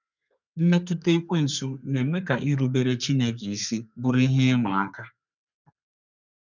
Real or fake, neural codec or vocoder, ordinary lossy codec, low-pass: fake; codec, 32 kHz, 1.9 kbps, SNAC; none; 7.2 kHz